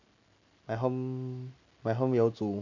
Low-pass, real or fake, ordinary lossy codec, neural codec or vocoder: 7.2 kHz; real; AAC, 48 kbps; none